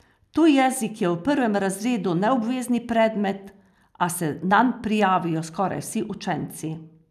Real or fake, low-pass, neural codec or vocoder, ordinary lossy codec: real; 14.4 kHz; none; none